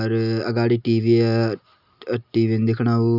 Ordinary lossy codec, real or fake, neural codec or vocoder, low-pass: none; real; none; 5.4 kHz